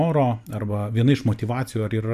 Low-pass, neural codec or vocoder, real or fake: 14.4 kHz; vocoder, 44.1 kHz, 128 mel bands every 512 samples, BigVGAN v2; fake